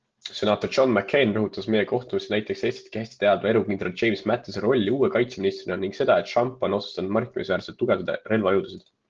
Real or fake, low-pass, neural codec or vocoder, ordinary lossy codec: real; 7.2 kHz; none; Opus, 16 kbps